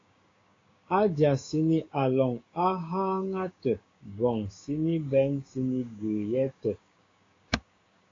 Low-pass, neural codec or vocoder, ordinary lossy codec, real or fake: 7.2 kHz; codec, 16 kHz, 6 kbps, DAC; AAC, 32 kbps; fake